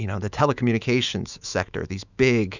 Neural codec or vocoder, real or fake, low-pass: autoencoder, 48 kHz, 128 numbers a frame, DAC-VAE, trained on Japanese speech; fake; 7.2 kHz